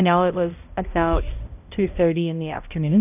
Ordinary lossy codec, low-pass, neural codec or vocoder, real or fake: AAC, 32 kbps; 3.6 kHz; codec, 16 kHz, 0.5 kbps, X-Codec, HuBERT features, trained on balanced general audio; fake